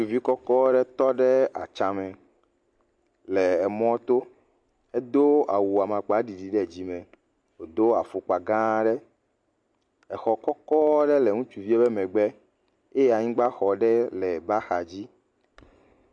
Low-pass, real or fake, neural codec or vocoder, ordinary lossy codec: 9.9 kHz; real; none; MP3, 64 kbps